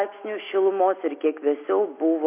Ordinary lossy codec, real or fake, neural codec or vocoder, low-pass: MP3, 24 kbps; real; none; 3.6 kHz